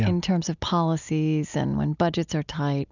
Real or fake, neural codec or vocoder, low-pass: real; none; 7.2 kHz